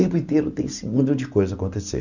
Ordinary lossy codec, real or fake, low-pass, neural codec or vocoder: none; real; 7.2 kHz; none